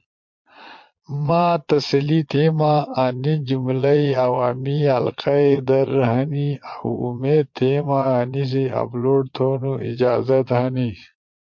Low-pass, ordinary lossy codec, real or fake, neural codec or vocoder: 7.2 kHz; MP3, 48 kbps; fake; vocoder, 22.05 kHz, 80 mel bands, Vocos